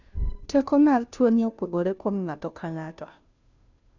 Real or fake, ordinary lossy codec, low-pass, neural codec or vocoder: fake; none; 7.2 kHz; codec, 16 kHz, 0.5 kbps, FunCodec, trained on Chinese and English, 25 frames a second